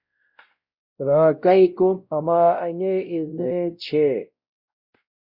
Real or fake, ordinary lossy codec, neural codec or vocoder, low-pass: fake; Opus, 64 kbps; codec, 16 kHz, 0.5 kbps, X-Codec, WavLM features, trained on Multilingual LibriSpeech; 5.4 kHz